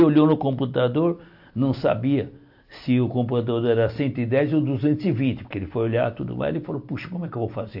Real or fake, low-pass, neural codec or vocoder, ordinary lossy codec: real; 5.4 kHz; none; AAC, 48 kbps